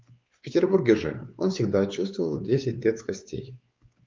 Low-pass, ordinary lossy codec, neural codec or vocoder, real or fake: 7.2 kHz; Opus, 24 kbps; codec, 16 kHz, 4 kbps, X-Codec, WavLM features, trained on Multilingual LibriSpeech; fake